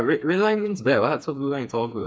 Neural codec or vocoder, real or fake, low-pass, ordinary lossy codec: codec, 16 kHz, 4 kbps, FreqCodec, smaller model; fake; none; none